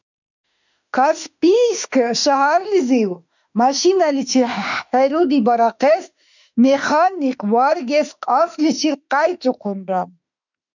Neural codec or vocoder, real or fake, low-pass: autoencoder, 48 kHz, 32 numbers a frame, DAC-VAE, trained on Japanese speech; fake; 7.2 kHz